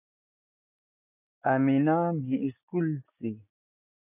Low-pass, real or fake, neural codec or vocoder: 3.6 kHz; real; none